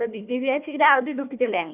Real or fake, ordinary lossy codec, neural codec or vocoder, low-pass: fake; none; codec, 16 kHz, 1 kbps, FunCodec, trained on Chinese and English, 50 frames a second; 3.6 kHz